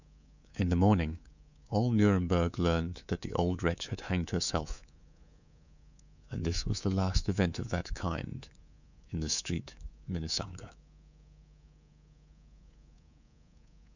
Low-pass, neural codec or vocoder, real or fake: 7.2 kHz; codec, 24 kHz, 3.1 kbps, DualCodec; fake